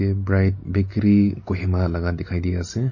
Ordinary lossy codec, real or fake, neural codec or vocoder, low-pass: MP3, 32 kbps; real; none; 7.2 kHz